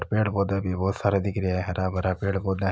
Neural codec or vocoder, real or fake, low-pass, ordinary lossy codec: none; real; none; none